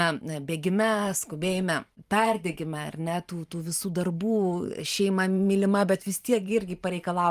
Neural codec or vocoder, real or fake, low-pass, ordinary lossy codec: vocoder, 44.1 kHz, 128 mel bands every 512 samples, BigVGAN v2; fake; 14.4 kHz; Opus, 32 kbps